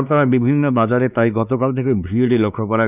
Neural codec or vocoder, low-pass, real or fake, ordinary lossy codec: codec, 16 kHz, 2 kbps, X-Codec, WavLM features, trained on Multilingual LibriSpeech; 3.6 kHz; fake; none